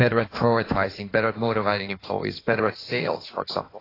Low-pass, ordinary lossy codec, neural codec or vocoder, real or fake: 5.4 kHz; AAC, 24 kbps; codec, 16 kHz in and 24 kHz out, 1.1 kbps, FireRedTTS-2 codec; fake